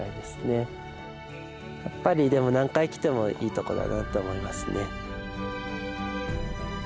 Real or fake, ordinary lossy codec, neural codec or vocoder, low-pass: real; none; none; none